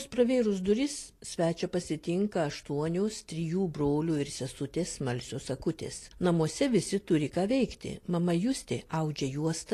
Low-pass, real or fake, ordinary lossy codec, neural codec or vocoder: 14.4 kHz; real; AAC, 48 kbps; none